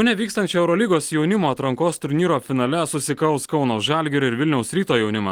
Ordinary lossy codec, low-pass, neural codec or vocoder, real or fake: Opus, 24 kbps; 19.8 kHz; none; real